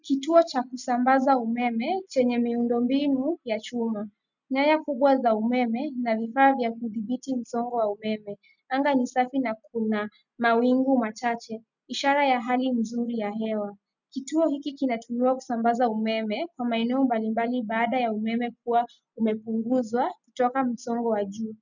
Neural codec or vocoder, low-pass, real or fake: none; 7.2 kHz; real